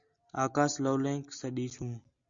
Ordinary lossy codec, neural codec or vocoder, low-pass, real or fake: Opus, 64 kbps; none; 7.2 kHz; real